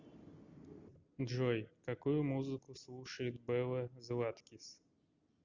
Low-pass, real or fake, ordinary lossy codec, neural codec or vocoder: 7.2 kHz; real; Opus, 64 kbps; none